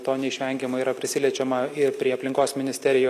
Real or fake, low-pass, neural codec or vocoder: real; 14.4 kHz; none